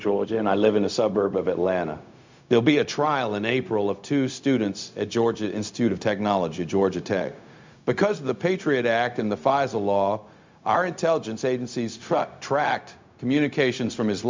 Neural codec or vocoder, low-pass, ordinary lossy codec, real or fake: codec, 16 kHz, 0.4 kbps, LongCat-Audio-Codec; 7.2 kHz; MP3, 64 kbps; fake